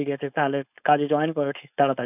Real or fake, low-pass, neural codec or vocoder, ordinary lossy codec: fake; 3.6 kHz; codec, 16 kHz, 4.8 kbps, FACodec; none